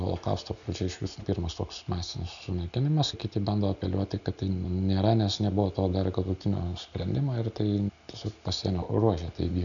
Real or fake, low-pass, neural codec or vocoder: real; 7.2 kHz; none